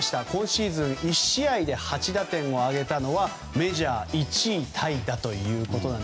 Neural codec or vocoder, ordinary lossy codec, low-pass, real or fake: none; none; none; real